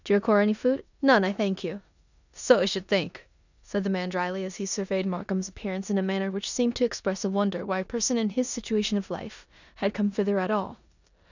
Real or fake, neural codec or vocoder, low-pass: fake; codec, 16 kHz in and 24 kHz out, 0.9 kbps, LongCat-Audio-Codec, four codebook decoder; 7.2 kHz